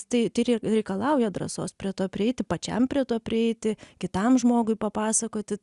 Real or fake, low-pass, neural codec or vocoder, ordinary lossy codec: real; 10.8 kHz; none; Opus, 64 kbps